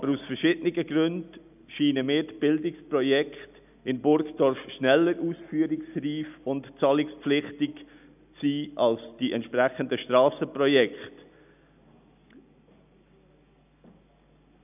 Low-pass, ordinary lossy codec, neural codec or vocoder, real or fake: 3.6 kHz; none; none; real